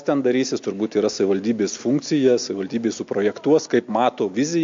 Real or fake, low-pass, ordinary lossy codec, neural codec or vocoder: real; 7.2 kHz; MP3, 48 kbps; none